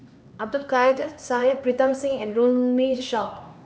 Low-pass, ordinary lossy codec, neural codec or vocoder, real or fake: none; none; codec, 16 kHz, 2 kbps, X-Codec, HuBERT features, trained on LibriSpeech; fake